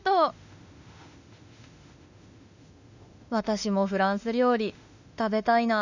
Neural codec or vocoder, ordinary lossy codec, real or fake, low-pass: autoencoder, 48 kHz, 32 numbers a frame, DAC-VAE, trained on Japanese speech; none; fake; 7.2 kHz